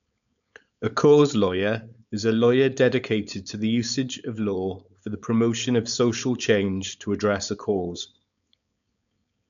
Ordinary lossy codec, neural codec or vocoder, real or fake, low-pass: none; codec, 16 kHz, 4.8 kbps, FACodec; fake; 7.2 kHz